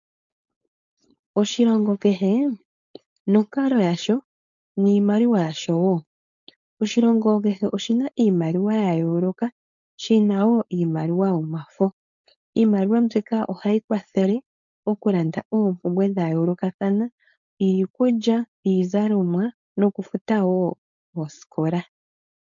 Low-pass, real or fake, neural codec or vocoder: 7.2 kHz; fake; codec, 16 kHz, 4.8 kbps, FACodec